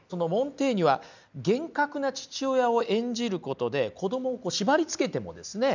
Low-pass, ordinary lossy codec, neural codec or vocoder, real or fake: 7.2 kHz; none; none; real